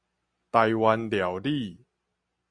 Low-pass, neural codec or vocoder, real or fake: 9.9 kHz; none; real